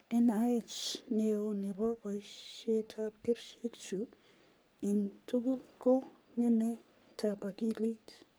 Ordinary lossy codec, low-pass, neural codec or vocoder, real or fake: none; none; codec, 44.1 kHz, 3.4 kbps, Pupu-Codec; fake